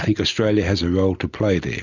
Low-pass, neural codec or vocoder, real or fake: 7.2 kHz; none; real